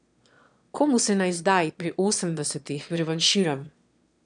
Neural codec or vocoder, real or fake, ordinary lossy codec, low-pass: autoencoder, 22.05 kHz, a latent of 192 numbers a frame, VITS, trained on one speaker; fake; none; 9.9 kHz